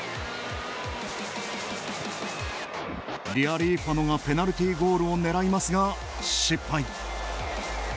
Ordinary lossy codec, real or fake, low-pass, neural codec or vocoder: none; real; none; none